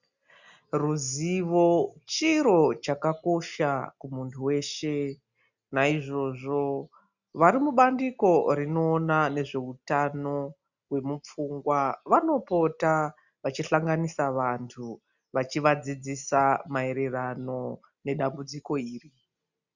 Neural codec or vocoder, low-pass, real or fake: none; 7.2 kHz; real